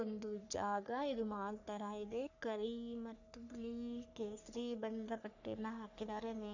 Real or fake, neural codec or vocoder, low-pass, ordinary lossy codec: fake; codec, 44.1 kHz, 3.4 kbps, Pupu-Codec; 7.2 kHz; none